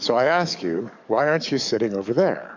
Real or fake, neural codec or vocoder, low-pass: fake; codec, 44.1 kHz, 7.8 kbps, DAC; 7.2 kHz